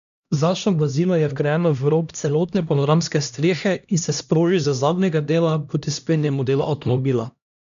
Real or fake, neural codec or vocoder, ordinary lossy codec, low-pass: fake; codec, 16 kHz, 1 kbps, X-Codec, HuBERT features, trained on LibriSpeech; none; 7.2 kHz